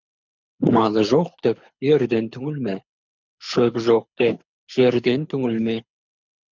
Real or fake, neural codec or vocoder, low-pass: fake; codec, 24 kHz, 6 kbps, HILCodec; 7.2 kHz